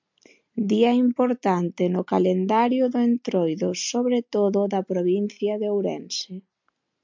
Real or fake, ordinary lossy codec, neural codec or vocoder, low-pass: real; MP3, 64 kbps; none; 7.2 kHz